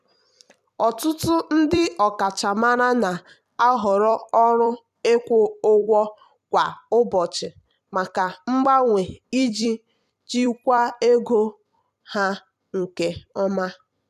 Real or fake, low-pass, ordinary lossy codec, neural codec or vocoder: fake; 14.4 kHz; none; vocoder, 44.1 kHz, 128 mel bands every 256 samples, BigVGAN v2